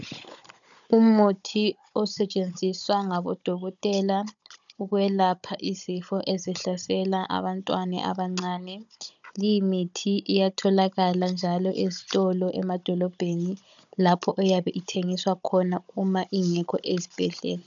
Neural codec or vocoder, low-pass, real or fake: codec, 16 kHz, 16 kbps, FunCodec, trained on Chinese and English, 50 frames a second; 7.2 kHz; fake